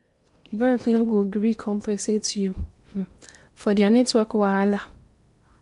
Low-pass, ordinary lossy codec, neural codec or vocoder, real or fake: 10.8 kHz; MP3, 64 kbps; codec, 16 kHz in and 24 kHz out, 0.8 kbps, FocalCodec, streaming, 65536 codes; fake